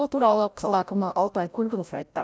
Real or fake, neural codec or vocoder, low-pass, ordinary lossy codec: fake; codec, 16 kHz, 0.5 kbps, FreqCodec, larger model; none; none